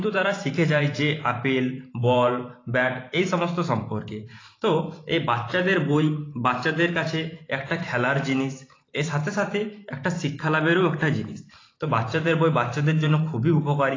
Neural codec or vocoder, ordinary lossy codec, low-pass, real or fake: vocoder, 44.1 kHz, 128 mel bands every 512 samples, BigVGAN v2; AAC, 32 kbps; 7.2 kHz; fake